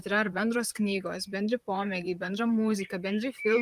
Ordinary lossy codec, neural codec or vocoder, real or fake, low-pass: Opus, 32 kbps; vocoder, 44.1 kHz, 128 mel bands, Pupu-Vocoder; fake; 14.4 kHz